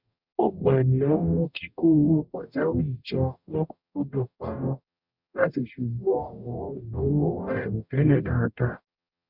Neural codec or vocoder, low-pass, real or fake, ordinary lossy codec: codec, 44.1 kHz, 0.9 kbps, DAC; 5.4 kHz; fake; none